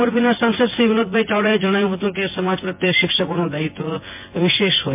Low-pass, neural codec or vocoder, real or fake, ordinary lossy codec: 3.6 kHz; vocoder, 24 kHz, 100 mel bands, Vocos; fake; MP3, 32 kbps